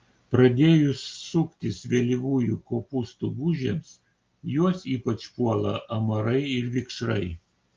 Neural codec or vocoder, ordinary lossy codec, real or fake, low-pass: none; Opus, 24 kbps; real; 7.2 kHz